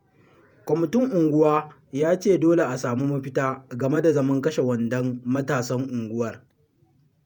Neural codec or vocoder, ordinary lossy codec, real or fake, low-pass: vocoder, 48 kHz, 128 mel bands, Vocos; none; fake; none